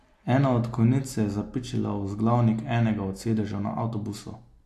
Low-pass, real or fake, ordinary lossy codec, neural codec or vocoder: 14.4 kHz; real; AAC, 64 kbps; none